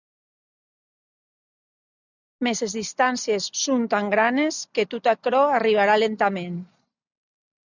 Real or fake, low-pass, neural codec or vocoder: real; 7.2 kHz; none